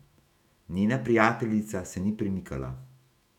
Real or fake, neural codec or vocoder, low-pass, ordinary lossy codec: fake; autoencoder, 48 kHz, 128 numbers a frame, DAC-VAE, trained on Japanese speech; 19.8 kHz; none